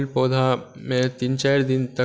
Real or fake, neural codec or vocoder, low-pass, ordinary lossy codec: real; none; none; none